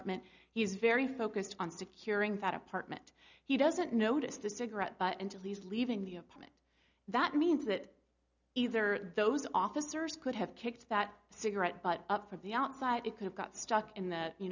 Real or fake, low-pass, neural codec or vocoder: real; 7.2 kHz; none